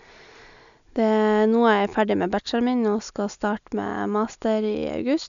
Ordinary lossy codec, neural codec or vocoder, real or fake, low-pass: none; none; real; 7.2 kHz